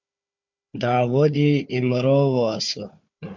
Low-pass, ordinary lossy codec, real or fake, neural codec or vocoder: 7.2 kHz; MP3, 64 kbps; fake; codec, 16 kHz, 4 kbps, FunCodec, trained on Chinese and English, 50 frames a second